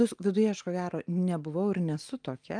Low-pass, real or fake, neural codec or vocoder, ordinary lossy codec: 9.9 kHz; real; none; Opus, 32 kbps